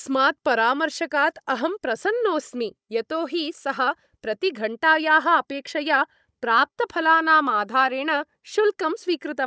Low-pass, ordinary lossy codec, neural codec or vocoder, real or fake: none; none; codec, 16 kHz, 16 kbps, FunCodec, trained on Chinese and English, 50 frames a second; fake